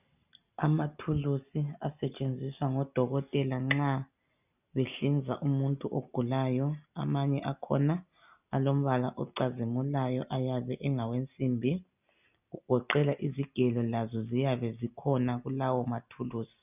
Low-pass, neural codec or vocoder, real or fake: 3.6 kHz; none; real